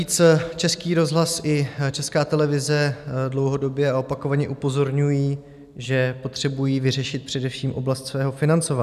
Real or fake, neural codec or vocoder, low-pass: real; none; 14.4 kHz